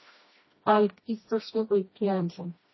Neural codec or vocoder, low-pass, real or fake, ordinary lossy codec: codec, 16 kHz, 1 kbps, FreqCodec, smaller model; 7.2 kHz; fake; MP3, 24 kbps